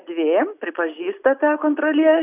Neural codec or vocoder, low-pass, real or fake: none; 3.6 kHz; real